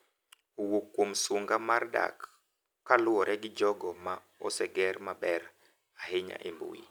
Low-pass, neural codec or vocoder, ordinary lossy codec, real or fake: none; none; none; real